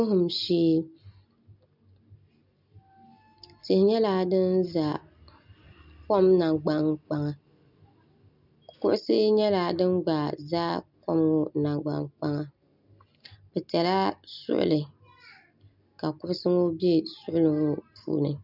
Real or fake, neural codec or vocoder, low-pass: real; none; 5.4 kHz